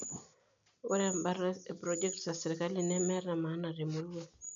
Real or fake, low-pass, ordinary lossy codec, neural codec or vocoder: real; 7.2 kHz; none; none